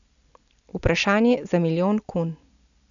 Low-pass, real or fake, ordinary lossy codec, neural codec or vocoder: 7.2 kHz; real; none; none